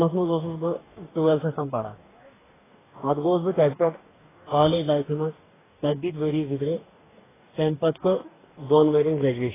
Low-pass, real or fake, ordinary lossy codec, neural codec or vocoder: 3.6 kHz; fake; AAC, 16 kbps; codec, 44.1 kHz, 2.6 kbps, DAC